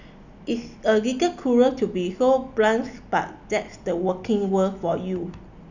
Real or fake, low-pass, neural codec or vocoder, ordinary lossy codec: real; 7.2 kHz; none; none